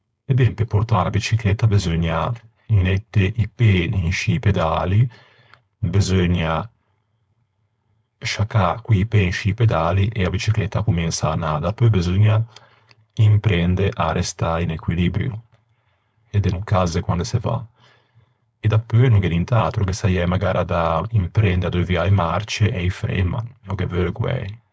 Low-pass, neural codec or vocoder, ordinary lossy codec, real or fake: none; codec, 16 kHz, 4.8 kbps, FACodec; none; fake